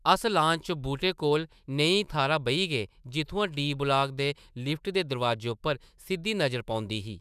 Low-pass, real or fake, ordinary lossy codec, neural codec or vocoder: 14.4 kHz; fake; none; autoencoder, 48 kHz, 128 numbers a frame, DAC-VAE, trained on Japanese speech